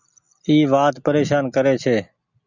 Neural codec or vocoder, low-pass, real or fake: none; 7.2 kHz; real